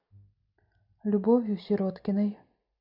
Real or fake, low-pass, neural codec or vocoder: real; 5.4 kHz; none